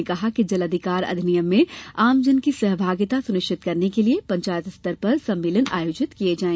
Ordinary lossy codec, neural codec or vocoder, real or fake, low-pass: none; none; real; none